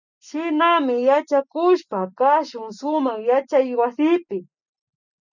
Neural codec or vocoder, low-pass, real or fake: none; 7.2 kHz; real